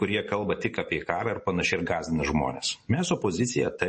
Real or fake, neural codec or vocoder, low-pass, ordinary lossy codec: real; none; 10.8 kHz; MP3, 32 kbps